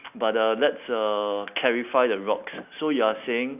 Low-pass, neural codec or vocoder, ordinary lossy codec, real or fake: 3.6 kHz; none; none; real